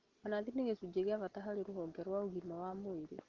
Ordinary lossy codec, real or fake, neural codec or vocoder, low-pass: Opus, 16 kbps; real; none; 7.2 kHz